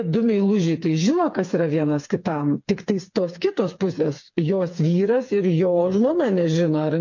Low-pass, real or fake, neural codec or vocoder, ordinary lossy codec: 7.2 kHz; fake; codec, 16 kHz, 4 kbps, FreqCodec, smaller model; MP3, 48 kbps